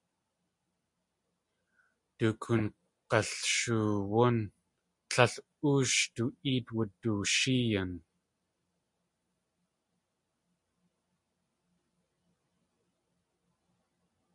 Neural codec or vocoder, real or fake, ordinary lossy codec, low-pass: none; real; MP3, 64 kbps; 10.8 kHz